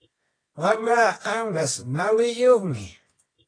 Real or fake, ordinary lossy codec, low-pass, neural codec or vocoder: fake; AAC, 32 kbps; 9.9 kHz; codec, 24 kHz, 0.9 kbps, WavTokenizer, medium music audio release